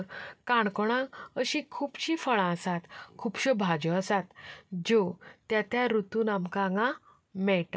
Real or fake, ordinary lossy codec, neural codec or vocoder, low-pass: real; none; none; none